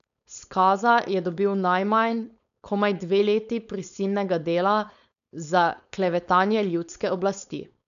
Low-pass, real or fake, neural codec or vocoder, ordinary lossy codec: 7.2 kHz; fake; codec, 16 kHz, 4.8 kbps, FACodec; none